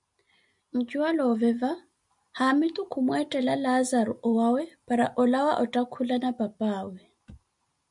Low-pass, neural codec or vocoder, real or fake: 10.8 kHz; none; real